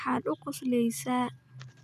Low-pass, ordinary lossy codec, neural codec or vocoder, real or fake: 14.4 kHz; none; none; real